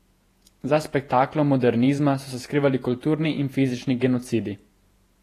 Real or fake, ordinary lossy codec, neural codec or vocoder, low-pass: fake; AAC, 48 kbps; vocoder, 48 kHz, 128 mel bands, Vocos; 14.4 kHz